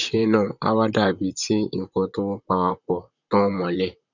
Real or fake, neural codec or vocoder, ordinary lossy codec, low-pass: fake; vocoder, 44.1 kHz, 128 mel bands, Pupu-Vocoder; none; 7.2 kHz